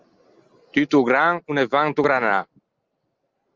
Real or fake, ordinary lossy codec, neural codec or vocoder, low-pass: real; Opus, 32 kbps; none; 7.2 kHz